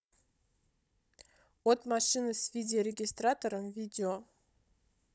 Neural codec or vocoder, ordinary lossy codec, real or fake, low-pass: codec, 16 kHz, 16 kbps, FunCodec, trained on Chinese and English, 50 frames a second; none; fake; none